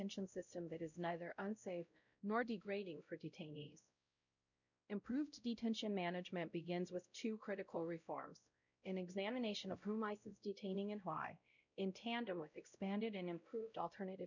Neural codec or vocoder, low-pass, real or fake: codec, 16 kHz, 0.5 kbps, X-Codec, WavLM features, trained on Multilingual LibriSpeech; 7.2 kHz; fake